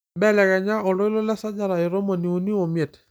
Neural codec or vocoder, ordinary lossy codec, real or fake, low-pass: none; none; real; none